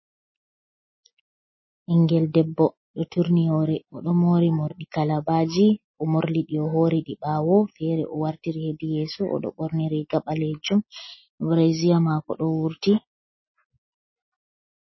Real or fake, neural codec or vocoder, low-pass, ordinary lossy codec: real; none; 7.2 kHz; MP3, 24 kbps